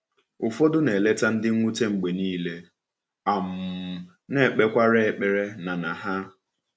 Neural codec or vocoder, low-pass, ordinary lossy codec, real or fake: none; none; none; real